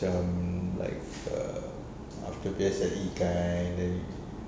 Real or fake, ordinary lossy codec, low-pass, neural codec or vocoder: real; none; none; none